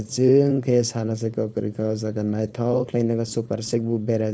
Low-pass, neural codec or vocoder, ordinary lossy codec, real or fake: none; codec, 16 kHz, 4.8 kbps, FACodec; none; fake